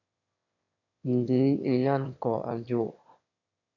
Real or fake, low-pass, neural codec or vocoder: fake; 7.2 kHz; autoencoder, 22.05 kHz, a latent of 192 numbers a frame, VITS, trained on one speaker